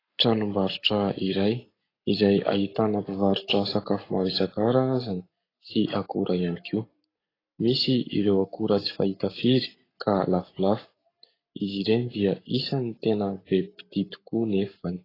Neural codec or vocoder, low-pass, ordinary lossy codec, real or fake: codec, 44.1 kHz, 7.8 kbps, Pupu-Codec; 5.4 kHz; AAC, 24 kbps; fake